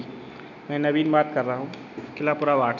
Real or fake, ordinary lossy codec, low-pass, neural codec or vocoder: real; none; 7.2 kHz; none